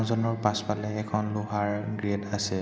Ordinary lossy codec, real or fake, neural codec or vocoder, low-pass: none; real; none; none